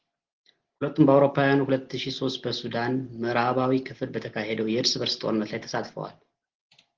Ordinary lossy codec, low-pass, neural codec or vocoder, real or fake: Opus, 16 kbps; 7.2 kHz; none; real